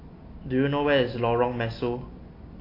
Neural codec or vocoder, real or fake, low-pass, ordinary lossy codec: none; real; 5.4 kHz; AAC, 32 kbps